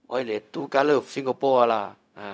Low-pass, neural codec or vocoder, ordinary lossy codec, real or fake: none; codec, 16 kHz, 0.4 kbps, LongCat-Audio-Codec; none; fake